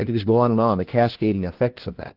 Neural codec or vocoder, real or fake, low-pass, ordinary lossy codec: codec, 16 kHz, 1 kbps, FunCodec, trained on LibriTTS, 50 frames a second; fake; 5.4 kHz; Opus, 16 kbps